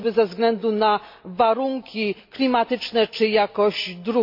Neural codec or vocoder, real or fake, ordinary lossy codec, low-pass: none; real; MP3, 32 kbps; 5.4 kHz